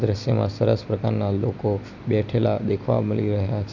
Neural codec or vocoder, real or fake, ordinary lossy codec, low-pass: none; real; none; 7.2 kHz